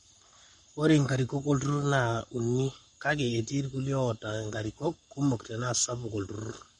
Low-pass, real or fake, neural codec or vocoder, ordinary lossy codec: 19.8 kHz; fake; codec, 44.1 kHz, 7.8 kbps, Pupu-Codec; MP3, 48 kbps